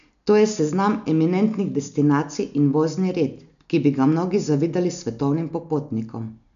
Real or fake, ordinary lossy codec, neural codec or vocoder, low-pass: real; none; none; 7.2 kHz